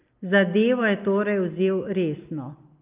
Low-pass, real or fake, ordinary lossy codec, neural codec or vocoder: 3.6 kHz; real; Opus, 24 kbps; none